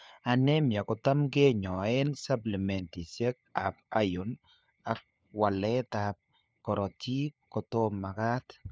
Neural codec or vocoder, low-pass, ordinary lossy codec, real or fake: codec, 16 kHz, 4 kbps, FunCodec, trained on LibriTTS, 50 frames a second; none; none; fake